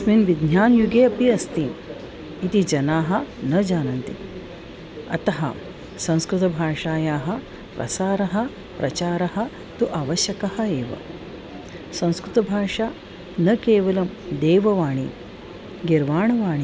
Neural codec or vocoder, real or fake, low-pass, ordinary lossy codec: none; real; none; none